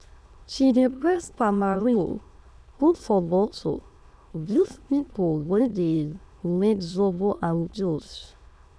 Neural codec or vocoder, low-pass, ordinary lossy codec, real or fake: autoencoder, 22.05 kHz, a latent of 192 numbers a frame, VITS, trained on many speakers; none; none; fake